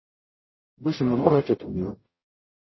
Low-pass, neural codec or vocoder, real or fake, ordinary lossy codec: 7.2 kHz; codec, 44.1 kHz, 0.9 kbps, DAC; fake; MP3, 24 kbps